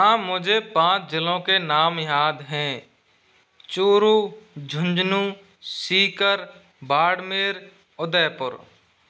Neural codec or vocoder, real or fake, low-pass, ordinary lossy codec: none; real; none; none